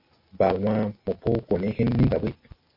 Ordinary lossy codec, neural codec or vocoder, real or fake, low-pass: MP3, 32 kbps; none; real; 5.4 kHz